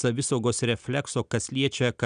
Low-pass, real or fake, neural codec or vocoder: 9.9 kHz; real; none